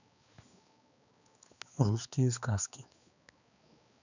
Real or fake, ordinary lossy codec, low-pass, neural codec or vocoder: fake; none; 7.2 kHz; codec, 16 kHz, 2 kbps, X-Codec, HuBERT features, trained on balanced general audio